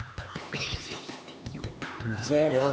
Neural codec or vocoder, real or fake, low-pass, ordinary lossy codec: codec, 16 kHz, 2 kbps, X-Codec, HuBERT features, trained on LibriSpeech; fake; none; none